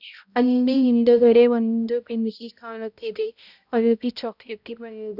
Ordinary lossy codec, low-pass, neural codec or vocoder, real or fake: none; 5.4 kHz; codec, 16 kHz, 0.5 kbps, X-Codec, HuBERT features, trained on balanced general audio; fake